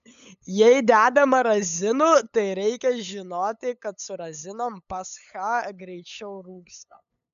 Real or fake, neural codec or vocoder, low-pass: fake; codec, 16 kHz, 8 kbps, FunCodec, trained on LibriTTS, 25 frames a second; 7.2 kHz